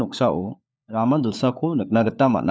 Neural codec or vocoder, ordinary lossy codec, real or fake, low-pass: codec, 16 kHz, 4 kbps, FunCodec, trained on LibriTTS, 50 frames a second; none; fake; none